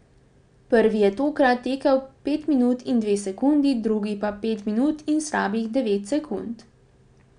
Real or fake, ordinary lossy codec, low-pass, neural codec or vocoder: real; none; 9.9 kHz; none